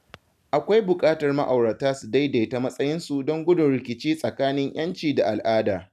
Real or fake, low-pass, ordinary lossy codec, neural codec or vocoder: real; 14.4 kHz; none; none